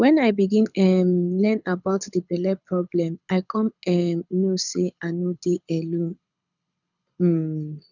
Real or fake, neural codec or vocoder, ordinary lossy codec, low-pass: fake; codec, 24 kHz, 6 kbps, HILCodec; none; 7.2 kHz